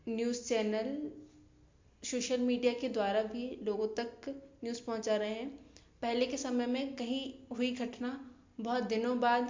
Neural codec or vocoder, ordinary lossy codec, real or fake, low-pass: none; MP3, 48 kbps; real; 7.2 kHz